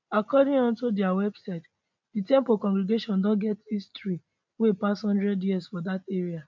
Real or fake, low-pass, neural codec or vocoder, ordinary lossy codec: real; 7.2 kHz; none; MP3, 64 kbps